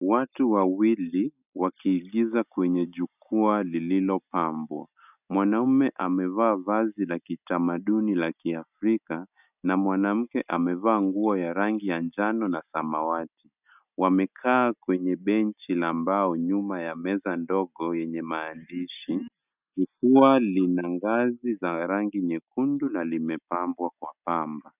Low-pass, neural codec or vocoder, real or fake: 3.6 kHz; none; real